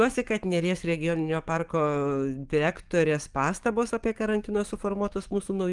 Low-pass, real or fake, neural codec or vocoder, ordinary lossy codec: 10.8 kHz; fake; codec, 44.1 kHz, 7.8 kbps, DAC; Opus, 32 kbps